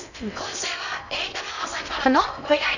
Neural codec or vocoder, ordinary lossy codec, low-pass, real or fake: codec, 16 kHz in and 24 kHz out, 0.6 kbps, FocalCodec, streaming, 2048 codes; none; 7.2 kHz; fake